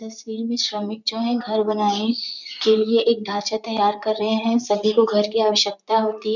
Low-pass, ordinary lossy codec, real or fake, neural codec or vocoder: 7.2 kHz; none; fake; vocoder, 44.1 kHz, 128 mel bands, Pupu-Vocoder